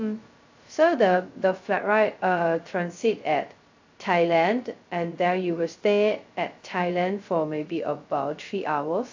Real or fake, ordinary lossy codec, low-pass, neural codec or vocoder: fake; AAC, 48 kbps; 7.2 kHz; codec, 16 kHz, 0.2 kbps, FocalCodec